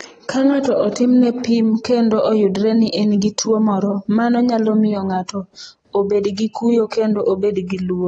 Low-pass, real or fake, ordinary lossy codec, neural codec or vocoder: 9.9 kHz; real; AAC, 32 kbps; none